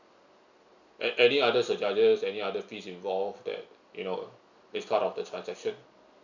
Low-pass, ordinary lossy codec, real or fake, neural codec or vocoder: 7.2 kHz; none; real; none